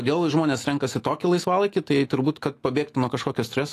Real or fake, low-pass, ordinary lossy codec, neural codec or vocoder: fake; 14.4 kHz; AAC, 48 kbps; autoencoder, 48 kHz, 128 numbers a frame, DAC-VAE, trained on Japanese speech